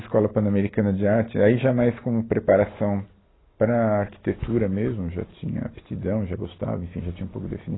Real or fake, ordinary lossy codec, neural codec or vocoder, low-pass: real; AAC, 16 kbps; none; 7.2 kHz